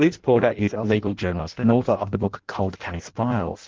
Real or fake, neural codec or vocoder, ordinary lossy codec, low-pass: fake; codec, 16 kHz in and 24 kHz out, 0.6 kbps, FireRedTTS-2 codec; Opus, 16 kbps; 7.2 kHz